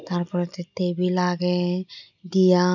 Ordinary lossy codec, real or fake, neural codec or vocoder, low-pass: none; real; none; 7.2 kHz